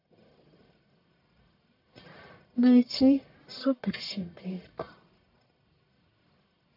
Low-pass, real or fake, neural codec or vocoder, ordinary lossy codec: 5.4 kHz; fake; codec, 44.1 kHz, 1.7 kbps, Pupu-Codec; AAC, 48 kbps